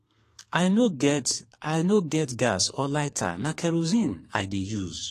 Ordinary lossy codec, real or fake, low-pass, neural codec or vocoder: AAC, 48 kbps; fake; 14.4 kHz; codec, 32 kHz, 1.9 kbps, SNAC